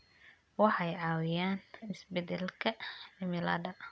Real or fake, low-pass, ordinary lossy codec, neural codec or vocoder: real; none; none; none